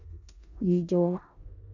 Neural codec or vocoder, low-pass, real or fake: codec, 16 kHz in and 24 kHz out, 0.4 kbps, LongCat-Audio-Codec, four codebook decoder; 7.2 kHz; fake